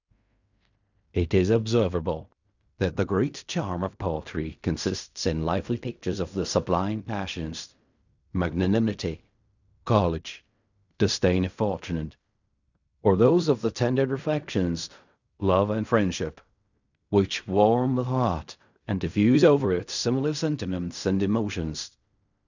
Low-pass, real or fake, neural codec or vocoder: 7.2 kHz; fake; codec, 16 kHz in and 24 kHz out, 0.4 kbps, LongCat-Audio-Codec, fine tuned four codebook decoder